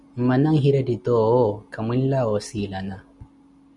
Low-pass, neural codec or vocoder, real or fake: 10.8 kHz; none; real